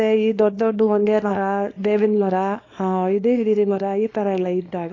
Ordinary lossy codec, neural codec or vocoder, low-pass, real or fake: AAC, 32 kbps; codec, 24 kHz, 0.9 kbps, WavTokenizer, small release; 7.2 kHz; fake